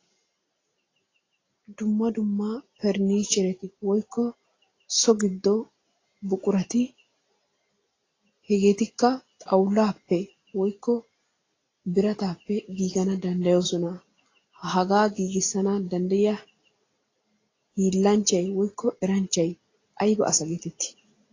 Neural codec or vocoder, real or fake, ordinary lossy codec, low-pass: none; real; AAC, 32 kbps; 7.2 kHz